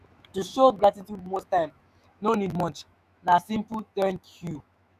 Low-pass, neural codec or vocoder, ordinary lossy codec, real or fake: 14.4 kHz; autoencoder, 48 kHz, 128 numbers a frame, DAC-VAE, trained on Japanese speech; none; fake